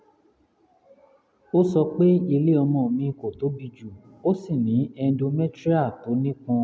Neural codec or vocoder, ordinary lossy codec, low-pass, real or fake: none; none; none; real